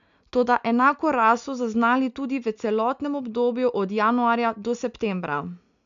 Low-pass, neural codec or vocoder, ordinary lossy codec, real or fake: 7.2 kHz; none; none; real